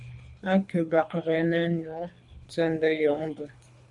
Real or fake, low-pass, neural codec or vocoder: fake; 10.8 kHz; codec, 24 kHz, 3 kbps, HILCodec